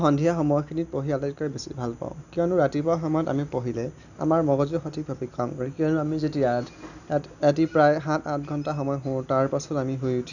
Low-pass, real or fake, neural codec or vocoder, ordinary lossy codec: 7.2 kHz; real; none; none